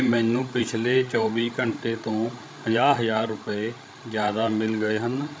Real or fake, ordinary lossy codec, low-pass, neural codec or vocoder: fake; none; none; codec, 16 kHz, 16 kbps, FreqCodec, larger model